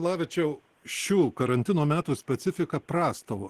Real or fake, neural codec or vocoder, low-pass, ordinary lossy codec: real; none; 14.4 kHz; Opus, 16 kbps